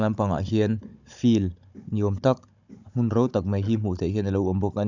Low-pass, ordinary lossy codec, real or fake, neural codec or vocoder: 7.2 kHz; none; fake; codec, 16 kHz, 16 kbps, FunCodec, trained on Chinese and English, 50 frames a second